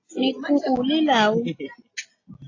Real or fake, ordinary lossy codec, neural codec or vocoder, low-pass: real; AAC, 32 kbps; none; 7.2 kHz